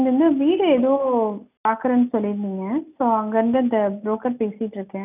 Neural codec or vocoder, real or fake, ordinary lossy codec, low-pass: none; real; none; 3.6 kHz